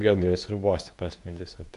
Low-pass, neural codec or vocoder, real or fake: 10.8 kHz; codec, 24 kHz, 0.9 kbps, WavTokenizer, medium speech release version 2; fake